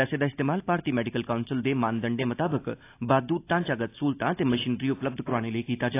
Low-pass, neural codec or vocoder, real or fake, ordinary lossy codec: 3.6 kHz; none; real; AAC, 24 kbps